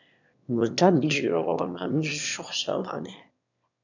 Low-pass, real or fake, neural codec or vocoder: 7.2 kHz; fake; autoencoder, 22.05 kHz, a latent of 192 numbers a frame, VITS, trained on one speaker